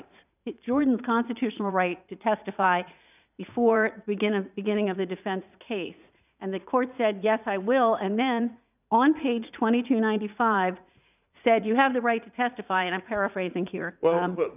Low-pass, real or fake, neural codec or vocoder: 3.6 kHz; fake; vocoder, 44.1 kHz, 80 mel bands, Vocos